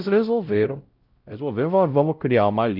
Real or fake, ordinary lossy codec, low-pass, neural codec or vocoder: fake; Opus, 24 kbps; 5.4 kHz; codec, 16 kHz, 0.5 kbps, X-Codec, WavLM features, trained on Multilingual LibriSpeech